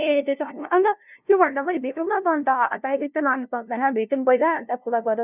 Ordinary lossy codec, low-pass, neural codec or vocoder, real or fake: none; 3.6 kHz; codec, 16 kHz, 0.5 kbps, FunCodec, trained on LibriTTS, 25 frames a second; fake